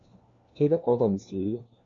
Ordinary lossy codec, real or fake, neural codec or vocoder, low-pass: MP3, 48 kbps; fake; codec, 16 kHz, 1 kbps, FunCodec, trained on LibriTTS, 50 frames a second; 7.2 kHz